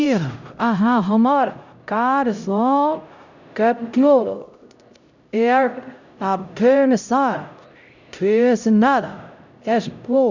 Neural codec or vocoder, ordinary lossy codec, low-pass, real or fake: codec, 16 kHz, 0.5 kbps, X-Codec, HuBERT features, trained on LibriSpeech; none; 7.2 kHz; fake